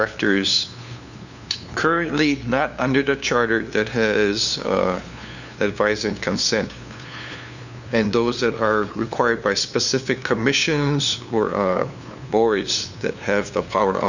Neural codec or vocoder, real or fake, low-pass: codec, 16 kHz, 2 kbps, FunCodec, trained on LibriTTS, 25 frames a second; fake; 7.2 kHz